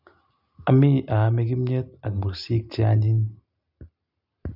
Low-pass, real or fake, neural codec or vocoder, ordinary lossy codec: 5.4 kHz; real; none; none